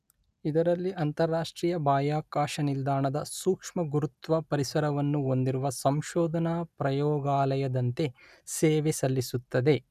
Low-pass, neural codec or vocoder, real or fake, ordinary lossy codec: 14.4 kHz; none; real; none